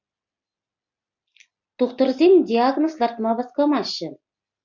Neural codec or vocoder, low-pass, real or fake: none; 7.2 kHz; real